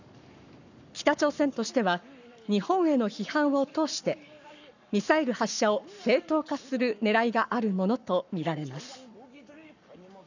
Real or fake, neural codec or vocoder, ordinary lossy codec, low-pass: fake; codec, 44.1 kHz, 7.8 kbps, Pupu-Codec; none; 7.2 kHz